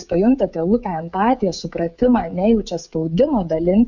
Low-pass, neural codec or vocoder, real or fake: 7.2 kHz; codec, 16 kHz, 16 kbps, FreqCodec, smaller model; fake